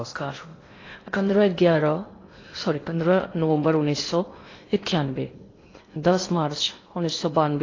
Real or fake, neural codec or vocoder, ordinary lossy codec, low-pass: fake; codec, 16 kHz in and 24 kHz out, 0.8 kbps, FocalCodec, streaming, 65536 codes; AAC, 32 kbps; 7.2 kHz